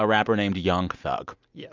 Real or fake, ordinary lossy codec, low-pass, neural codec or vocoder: real; Opus, 64 kbps; 7.2 kHz; none